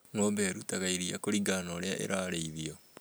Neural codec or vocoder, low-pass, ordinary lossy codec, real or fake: none; none; none; real